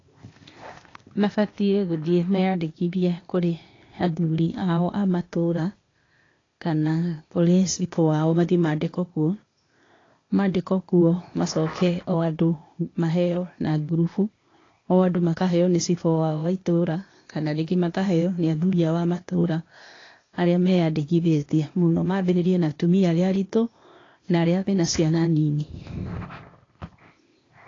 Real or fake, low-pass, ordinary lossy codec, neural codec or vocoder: fake; 7.2 kHz; AAC, 32 kbps; codec, 16 kHz, 0.8 kbps, ZipCodec